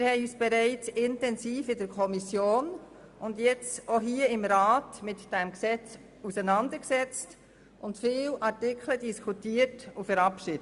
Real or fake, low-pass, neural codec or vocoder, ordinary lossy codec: real; 10.8 kHz; none; Opus, 64 kbps